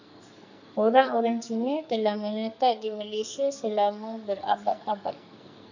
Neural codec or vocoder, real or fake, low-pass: codec, 44.1 kHz, 2.6 kbps, SNAC; fake; 7.2 kHz